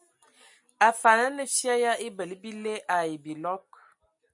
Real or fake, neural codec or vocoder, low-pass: real; none; 10.8 kHz